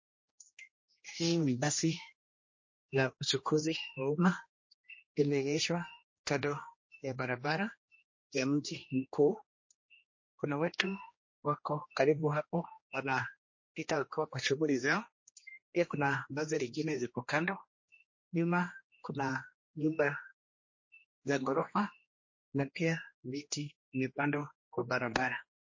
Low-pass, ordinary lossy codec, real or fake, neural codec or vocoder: 7.2 kHz; MP3, 32 kbps; fake; codec, 16 kHz, 1 kbps, X-Codec, HuBERT features, trained on general audio